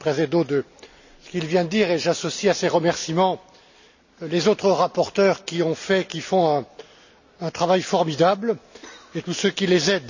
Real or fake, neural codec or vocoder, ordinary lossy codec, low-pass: real; none; none; 7.2 kHz